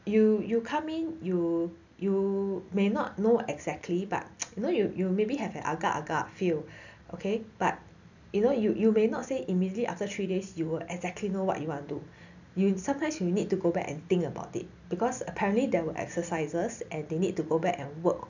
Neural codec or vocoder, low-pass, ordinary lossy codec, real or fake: none; 7.2 kHz; none; real